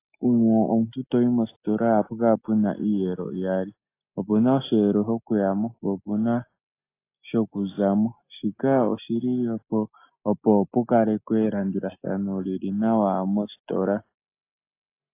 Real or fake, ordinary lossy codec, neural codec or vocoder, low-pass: real; AAC, 24 kbps; none; 3.6 kHz